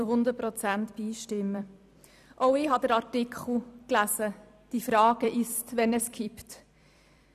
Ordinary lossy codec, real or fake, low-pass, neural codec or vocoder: none; fake; 14.4 kHz; vocoder, 44.1 kHz, 128 mel bands every 256 samples, BigVGAN v2